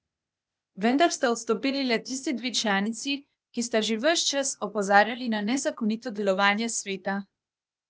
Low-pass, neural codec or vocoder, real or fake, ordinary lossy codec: none; codec, 16 kHz, 0.8 kbps, ZipCodec; fake; none